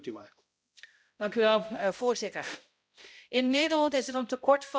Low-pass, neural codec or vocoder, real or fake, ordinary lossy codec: none; codec, 16 kHz, 0.5 kbps, X-Codec, HuBERT features, trained on balanced general audio; fake; none